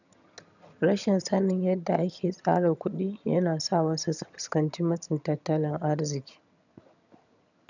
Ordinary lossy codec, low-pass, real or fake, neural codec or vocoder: none; 7.2 kHz; fake; vocoder, 22.05 kHz, 80 mel bands, HiFi-GAN